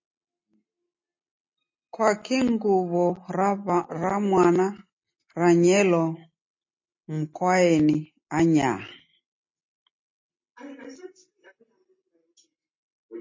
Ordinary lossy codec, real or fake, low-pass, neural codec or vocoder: MP3, 32 kbps; real; 7.2 kHz; none